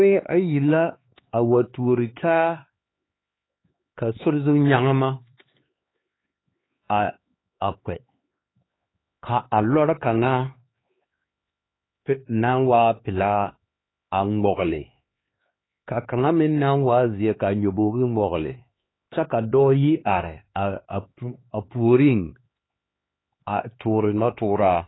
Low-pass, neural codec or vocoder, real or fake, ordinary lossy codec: 7.2 kHz; codec, 16 kHz, 4 kbps, X-Codec, HuBERT features, trained on LibriSpeech; fake; AAC, 16 kbps